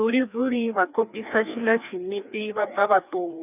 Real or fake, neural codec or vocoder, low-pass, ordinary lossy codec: fake; codec, 16 kHz, 2 kbps, FreqCodec, larger model; 3.6 kHz; none